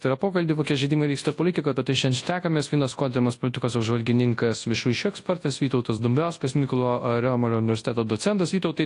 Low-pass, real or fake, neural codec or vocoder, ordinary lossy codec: 10.8 kHz; fake; codec, 24 kHz, 0.9 kbps, WavTokenizer, large speech release; AAC, 48 kbps